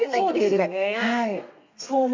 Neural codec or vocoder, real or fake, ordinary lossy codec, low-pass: codec, 32 kHz, 1.9 kbps, SNAC; fake; MP3, 48 kbps; 7.2 kHz